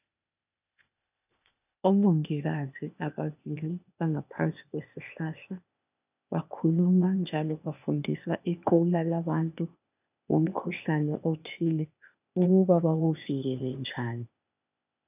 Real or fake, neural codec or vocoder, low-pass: fake; codec, 16 kHz, 0.8 kbps, ZipCodec; 3.6 kHz